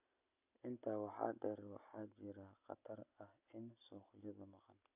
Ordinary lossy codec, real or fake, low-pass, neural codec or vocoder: none; real; 3.6 kHz; none